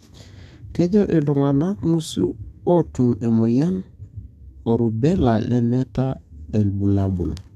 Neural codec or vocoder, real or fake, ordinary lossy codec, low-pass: codec, 32 kHz, 1.9 kbps, SNAC; fake; none; 14.4 kHz